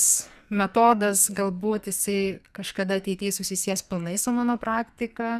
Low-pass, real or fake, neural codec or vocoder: 14.4 kHz; fake; codec, 44.1 kHz, 2.6 kbps, SNAC